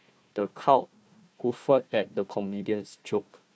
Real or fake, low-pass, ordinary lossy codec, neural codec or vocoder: fake; none; none; codec, 16 kHz, 1 kbps, FunCodec, trained on Chinese and English, 50 frames a second